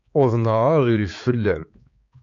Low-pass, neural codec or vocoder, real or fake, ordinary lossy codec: 7.2 kHz; codec, 16 kHz, 4 kbps, X-Codec, HuBERT features, trained on balanced general audio; fake; MP3, 48 kbps